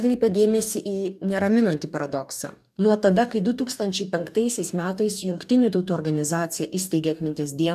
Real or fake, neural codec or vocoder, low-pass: fake; codec, 44.1 kHz, 2.6 kbps, DAC; 14.4 kHz